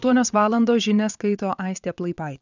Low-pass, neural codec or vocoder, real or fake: 7.2 kHz; vocoder, 22.05 kHz, 80 mel bands, WaveNeXt; fake